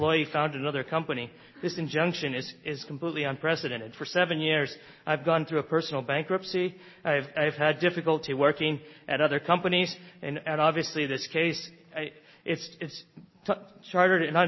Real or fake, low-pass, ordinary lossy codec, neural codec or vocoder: real; 7.2 kHz; MP3, 24 kbps; none